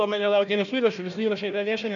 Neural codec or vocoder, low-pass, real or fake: codec, 16 kHz, 1 kbps, FunCodec, trained on Chinese and English, 50 frames a second; 7.2 kHz; fake